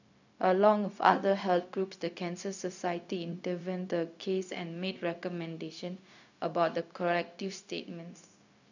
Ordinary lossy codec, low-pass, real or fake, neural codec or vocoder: none; 7.2 kHz; fake; codec, 16 kHz, 0.4 kbps, LongCat-Audio-Codec